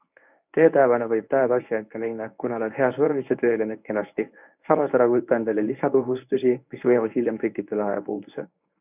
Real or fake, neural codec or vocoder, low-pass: fake; codec, 24 kHz, 0.9 kbps, WavTokenizer, medium speech release version 1; 3.6 kHz